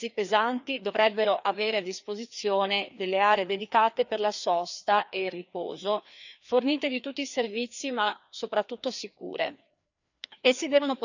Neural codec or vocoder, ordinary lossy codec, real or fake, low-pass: codec, 16 kHz, 2 kbps, FreqCodec, larger model; none; fake; 7.2 kHz